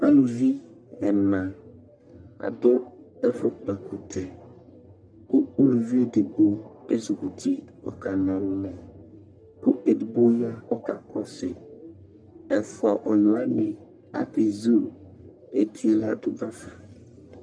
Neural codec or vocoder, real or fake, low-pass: codec, 44.1 kHz, 1.7 kbps, Pupu-Codec; fake; 9.9 kHz